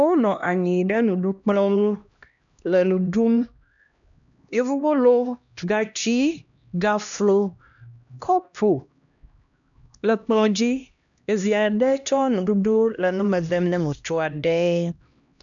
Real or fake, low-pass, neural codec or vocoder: fake; 7.2 kHz; codec, 16 kHz, 1 kbps, X-Codec, HuBERT features, trained on LibriSpeech